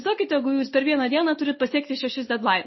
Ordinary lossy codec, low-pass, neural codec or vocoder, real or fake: MP3, 24 kbps; 7.2 kHz; none; real